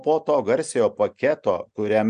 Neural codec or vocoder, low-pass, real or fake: none; 9.9 kHz; real